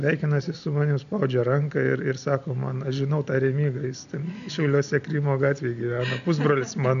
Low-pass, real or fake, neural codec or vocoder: 7.2 kHz; real; none